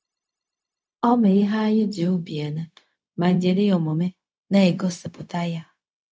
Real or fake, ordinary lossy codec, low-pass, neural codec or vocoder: fake; none; none; codec, 16 kHz, 0.4 kbps, LongCat-Audio-Codec